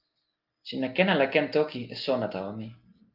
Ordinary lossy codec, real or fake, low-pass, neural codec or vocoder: Opus, 32 kbps; real; 5.4 kHz; none